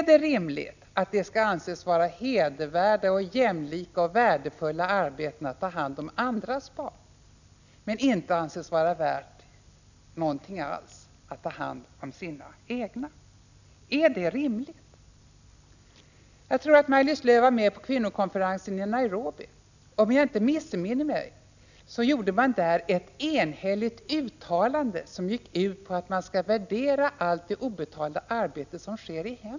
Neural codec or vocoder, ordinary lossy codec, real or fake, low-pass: none; none; real; 7.2 kHz